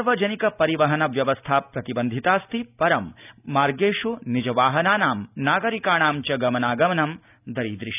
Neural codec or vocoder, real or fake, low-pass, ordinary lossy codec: none; real; 3.6 kHz; none